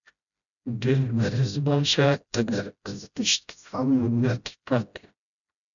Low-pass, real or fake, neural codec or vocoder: 7.2 kHz; fake; codec, 16 kHz, 0.5 kbps, FreqCodec, smaller model